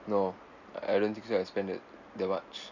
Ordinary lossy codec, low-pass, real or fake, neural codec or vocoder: none; 7.2 kHz; real; none